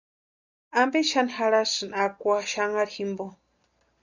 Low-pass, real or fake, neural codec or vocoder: 7.2 kHz; real; none